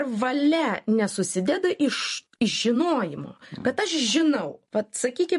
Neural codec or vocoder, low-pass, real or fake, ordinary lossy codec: vocoder, 44.1 kHz, 128 mel bands every 512 samples, BigVGAN v2; 14.4 kHz; fake; MP3, 48 kbps